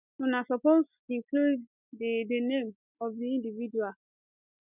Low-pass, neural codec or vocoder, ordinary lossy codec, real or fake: 3.6 kHz; none; none; real